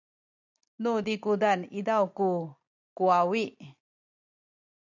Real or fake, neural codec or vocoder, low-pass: real; none; 7.2 kHz